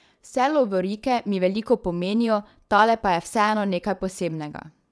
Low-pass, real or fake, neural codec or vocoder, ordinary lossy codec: none; fake; vocoder, 22.05 kHz, 80 mel bands, WaveNeXt; none